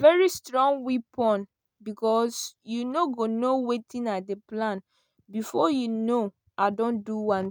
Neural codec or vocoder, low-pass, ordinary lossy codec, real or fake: none; none; none; real